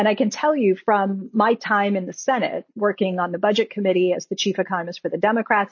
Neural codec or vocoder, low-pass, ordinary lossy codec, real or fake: none; 7.2 kHz; MP3, 32 kbps; real